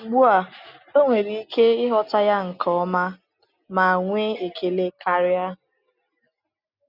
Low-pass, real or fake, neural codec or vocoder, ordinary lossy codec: 5.4 kHz; real; none; none